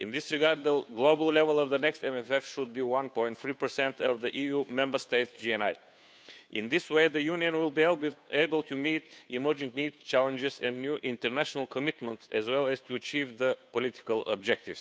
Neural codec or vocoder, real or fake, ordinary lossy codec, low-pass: codec, 16 kHz, 2 kbps, FunCodec, trained on Chinese and English, 25 frames a second; fake; none; none